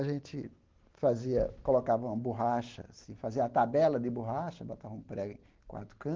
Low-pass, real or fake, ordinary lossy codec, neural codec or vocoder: 7.2 kHz; real; Opus, 16 kbps; none